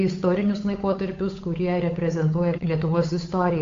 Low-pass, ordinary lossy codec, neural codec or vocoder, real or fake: 7.2 kHz; AAC, 48 kbps; codec, 16 kHz, 8 kbps, FunCodec, trained on Chinese and English, 25 frames a second; fake